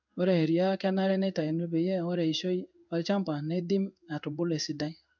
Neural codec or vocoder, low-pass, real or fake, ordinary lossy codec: codec, 16 kHz in and 24 kHz out, 1 kbps, XY-Tokenizer; 7.2 kHz; fake; none